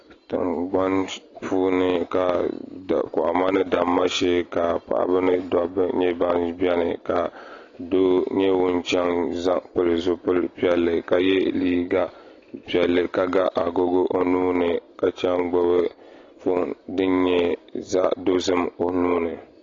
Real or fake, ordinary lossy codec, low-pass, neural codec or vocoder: real; AAC, 32 kbps; 7.2 kHz; none